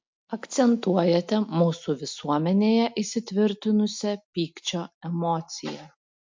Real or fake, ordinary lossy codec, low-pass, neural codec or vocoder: real; MP3, 48 kbps; 7.2 kHz; none